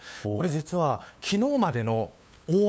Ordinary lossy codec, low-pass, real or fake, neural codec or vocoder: none; none; fake; codec, 16 kHz, 2 kbps, FunCodec, trained on LibriTTS, 25 frames a second